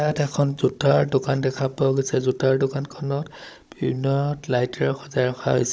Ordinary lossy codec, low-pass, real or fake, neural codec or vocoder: none; none; fake; codec, 16 kHz, 16 kbps, FunCodec, trained on Chinese and English, 50 frames a second